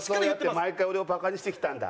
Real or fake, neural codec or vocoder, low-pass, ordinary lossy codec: real; none; none; none